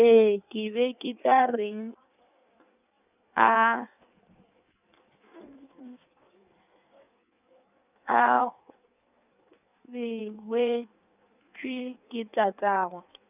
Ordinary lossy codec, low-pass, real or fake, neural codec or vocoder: none; 3.6 kHz; fake; codec, 16 kHz in and 24 kHz out, 1.1 kbps, FireRedTTS-2 codec